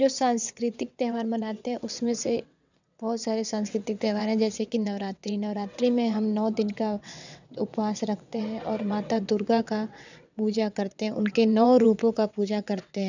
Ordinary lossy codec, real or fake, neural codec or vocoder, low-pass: none; fake; vocoder, 22.05 kHz, 80 mel bands, WaveNeXt; 7.2 kHz